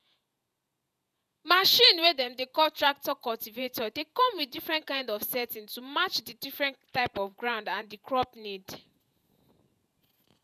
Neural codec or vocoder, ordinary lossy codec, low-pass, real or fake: vocoder, 48 kHz, 128 mel bands, Vocos; none; 14.4 kHz; fake